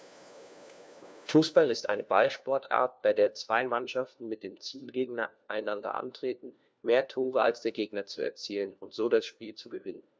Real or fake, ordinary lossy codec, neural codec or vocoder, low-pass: fake; none; codec, 16 kHz, 1 kbps, FunCodec, trained on LibriTTS, 50 frames a second; none